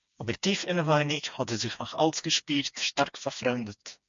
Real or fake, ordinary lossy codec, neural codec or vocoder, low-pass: fake; MP3, 64 kbps; codec, 16 kHz, 2 kbps, FreqCodec, smaller model; 7.2 kHz